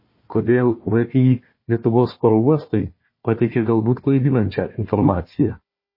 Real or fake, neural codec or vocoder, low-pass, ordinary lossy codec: fake; codec, 16 kHz, 1 kbps, FunCodec, trained on Chinese and English, 50 frames a second; 5.4 kHz; MP3, 24 kbps